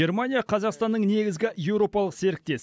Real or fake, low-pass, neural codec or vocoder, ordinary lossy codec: real; none; none; none